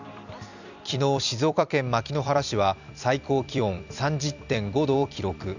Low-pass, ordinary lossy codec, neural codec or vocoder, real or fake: 7.2 kHz; none; vocoder, 44.1 kHz, 128 mel bands every 256 samples, BigVGAN v2; fake